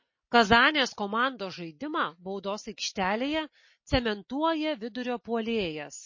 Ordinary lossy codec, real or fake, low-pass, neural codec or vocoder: MP3, 32 kbps; real; 7.2 kHz; none